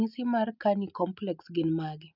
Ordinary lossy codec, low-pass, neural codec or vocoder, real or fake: none; 5.4 kHz; none; real